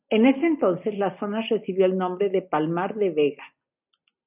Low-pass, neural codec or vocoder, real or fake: 3.6 kHz; none; real